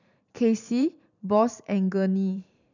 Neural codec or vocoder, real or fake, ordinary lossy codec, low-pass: vocoder, 44.1 kHz, 128 mel bands every 512 samples, BigVGAN v2; fake; none; 7.2 kHz